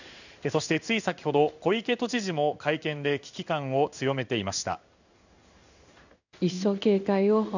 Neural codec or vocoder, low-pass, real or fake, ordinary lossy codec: codec, 16 kHz in and 24 kHz out, 1 kbps, XY-Tokenizer; 7.2 kHz; fake; none